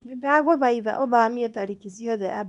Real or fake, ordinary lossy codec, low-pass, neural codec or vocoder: fake; none; 10.8 kHz; codec, 24 kHz, 0.9 kbps, WavTokenizer, small release